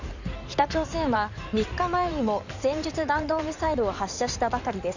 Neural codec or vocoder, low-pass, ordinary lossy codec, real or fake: codec, 16 kHz in and 24 kHz out, 2.2 kbps, FireRedTTS-2 codec; 7.2 kHz; Opus, 64 kbps; fake